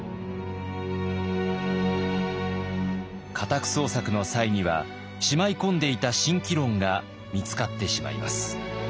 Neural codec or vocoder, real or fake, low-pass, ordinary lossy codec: none; real; none; none